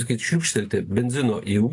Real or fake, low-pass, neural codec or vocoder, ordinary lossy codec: real; 10.8 kHz; none; AAC, 48 kbps